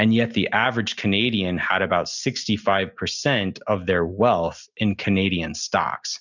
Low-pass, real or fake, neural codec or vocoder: 7.2 kHz; real; none